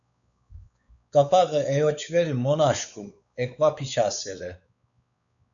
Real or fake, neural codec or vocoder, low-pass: fake; codec, 16 kHz, 4 kbps, X-Codec, WavLM features, trained on Multilingual LibriSpeech; 7.2 kHz